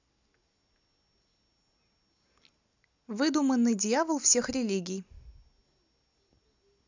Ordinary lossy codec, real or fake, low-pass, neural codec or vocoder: none; real; 7.2 kHz; none